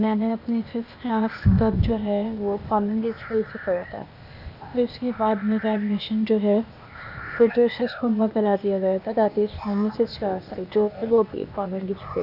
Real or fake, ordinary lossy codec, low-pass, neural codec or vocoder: fake; none; 5.4 kHz; codec, 16 kHz, 0.8 kbps, ZipCodec